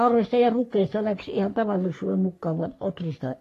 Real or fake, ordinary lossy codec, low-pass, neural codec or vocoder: fake; AAC, 48 kbps; 14.4 kHz; codec, 44.1 kHz, 3.4 kbps, Pupu-Codec